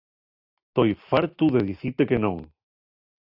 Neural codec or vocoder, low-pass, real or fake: none; 5.4 kHz; real